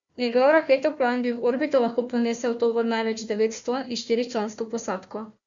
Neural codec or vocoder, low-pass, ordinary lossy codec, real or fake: codec, 16 kHz, 1 kbps, FunCodec, trained on Chinese and English, 50 frames a second; 7.2 kHz; MP3, 64 kbps; fake